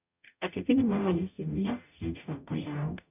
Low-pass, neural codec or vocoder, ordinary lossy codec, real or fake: 3.6 kHz; codec, 44.1 kHz, 0.9 kbps, DAC; none; fake